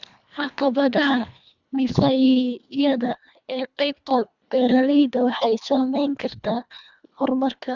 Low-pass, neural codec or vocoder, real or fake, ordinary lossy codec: 7.2 kHz; codec, 24 kHz, 1.5 kbps, HILCodec; fake; none